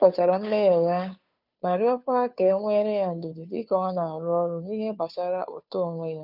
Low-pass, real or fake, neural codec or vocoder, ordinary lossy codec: 5.4 kHz; fake; codec, 16 kHz, 2 kbps, FunCodec, trained on Chinese and English, 25 frames a second; none